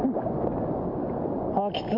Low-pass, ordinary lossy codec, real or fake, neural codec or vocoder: 5.4 kHz; none; real; none